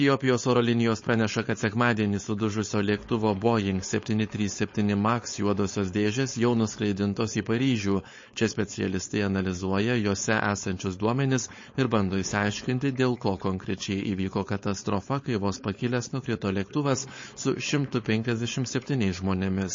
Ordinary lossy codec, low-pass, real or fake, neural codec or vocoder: MP3, 32 kbps; 7.2 kHz; fake; codec, 16 kHz, 4.8 kbps, FACodec